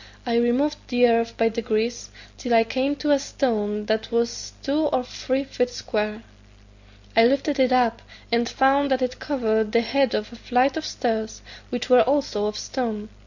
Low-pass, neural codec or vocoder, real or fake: 7.2 kHz; none; real